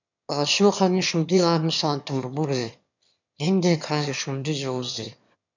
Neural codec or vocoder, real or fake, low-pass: autoencoder, 22.05 kHz, a latent of 192 numbers a frame, VITS, trained on one speaker; fake; 7.2 kHz